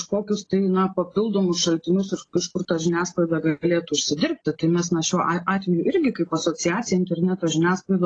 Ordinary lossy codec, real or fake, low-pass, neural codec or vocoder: AAC, 32 kbps; real; 9.9 kHz; none